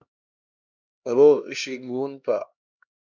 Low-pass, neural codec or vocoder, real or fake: 7.2 kHz; codec, 16 kHz, 1 kbps, X-Codec, HuBERT features, trained on LibriSpeech; fake